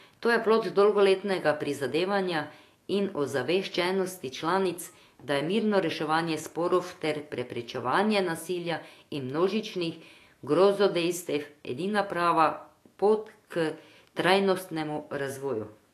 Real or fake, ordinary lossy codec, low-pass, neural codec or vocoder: fake; AAC, 48 kbps; 14.4 kHz; autoencoder, 48 kHz, 128 numbers a frame, DAC-VAE, trained on Japanese speech